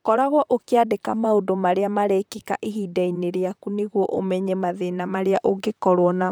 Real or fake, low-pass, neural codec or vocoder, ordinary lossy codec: fake; none; vocoder, 44.1 kHz, 128 mel bands, Pupu-Vocoder; none